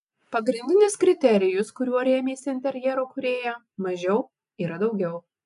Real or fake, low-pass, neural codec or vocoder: real; 10.8 kHz; none